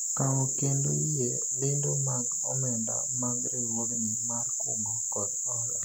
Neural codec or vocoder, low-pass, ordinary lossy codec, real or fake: none; 19.8 kHz; MP3, 96 kbps; real